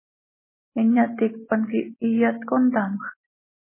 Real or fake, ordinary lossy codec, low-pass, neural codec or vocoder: real; MP3, 16 kbps; 3.6 kHz; none